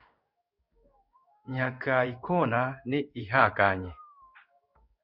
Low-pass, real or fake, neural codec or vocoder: 5.4 kHz; fake; codec, 16 kHz in and 24 kHz out, 1 kbps, XY-Tokenizer